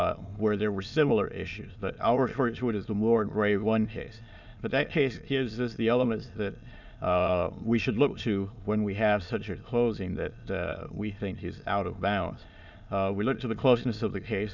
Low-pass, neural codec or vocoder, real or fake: 7.2 kHz; autoencoder, 22.05 kHz, a latent of 192 numbers a frame, VITS, trained on many speakers; fake